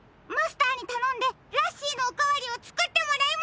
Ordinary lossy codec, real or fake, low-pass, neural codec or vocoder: none; real; none; none